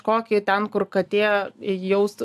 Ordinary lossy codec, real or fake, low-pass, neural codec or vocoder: AAC, 96 kbps; real; 14.4 kHz; none